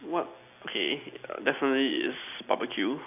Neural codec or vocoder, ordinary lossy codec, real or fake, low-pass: none; none; real; 3.6 kHz